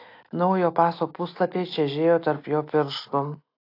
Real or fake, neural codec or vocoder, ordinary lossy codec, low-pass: real; none; AAC, 32 kbps; 5.4 kHz